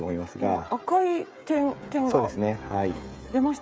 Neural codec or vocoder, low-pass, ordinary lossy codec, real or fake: codec, 16 kHz, 16 kbps, FreqCodec, smaller model; none; none; fake